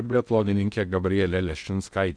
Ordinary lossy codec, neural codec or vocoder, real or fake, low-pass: Opus, 64 kbps; codec, 16 kHz in and 24 kHz out, 0.6 kbps, FocalCodec, streaming, 2048 codes; fake; 9.9 kHz